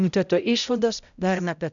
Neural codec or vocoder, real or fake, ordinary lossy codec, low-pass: codec, 16 kHz, 0.5 kbps, X-Codec, HuBERT features, trained on balanced general audio; fake; MP3, 96 kbps; 7.2 kHz